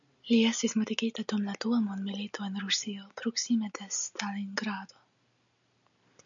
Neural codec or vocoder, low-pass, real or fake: none; 7.2 kHz; real